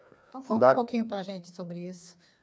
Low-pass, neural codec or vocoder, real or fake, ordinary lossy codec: none; codec, 16 kHz, 2 kbps, FreqCodec, larger model; fake; none